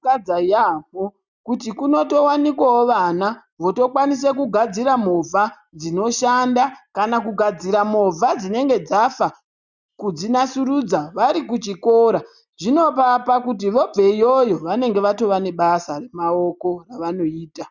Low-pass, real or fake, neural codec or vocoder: 7.2 kHz; real; none